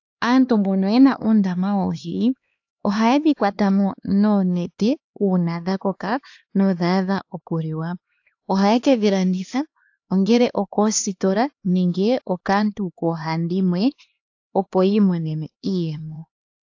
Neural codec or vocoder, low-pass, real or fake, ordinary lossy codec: codec, 16 kHz, 2 kbps, X-Codec, HuBERT features, trained on LibriSpeech; 7.2 kHz; fake; AAC, 48 kbps